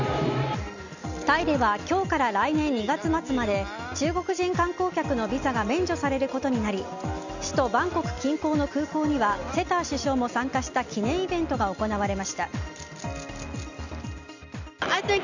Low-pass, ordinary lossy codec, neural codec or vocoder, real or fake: 7.2 kHz; none; none; real